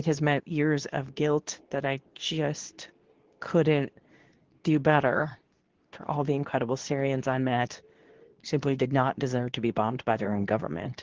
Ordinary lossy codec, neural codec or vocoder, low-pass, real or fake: Opus, 16 kbps; codec, 24 kHz, 0.9 kbps, WavTokenizer, medium speech release version 2; 7.2 kHz; fake